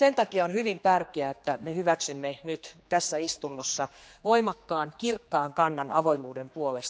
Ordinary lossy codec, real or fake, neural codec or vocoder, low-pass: none; fake; codec, 16 kHz, 2 kbps, X-Codec, HuBERT features, trained on general audio; none